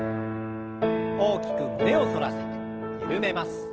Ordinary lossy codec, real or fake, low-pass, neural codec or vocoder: Opus, 16 kbps; real; 7.2 kHz; none